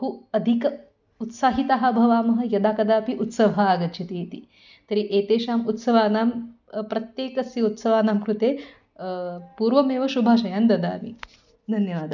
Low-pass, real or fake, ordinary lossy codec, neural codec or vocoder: 7.2 kHz; real; none; none